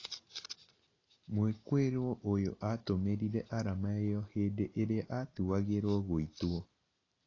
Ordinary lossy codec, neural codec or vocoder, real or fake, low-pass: AAC, 32 kbps; none; real; 7.2 kHz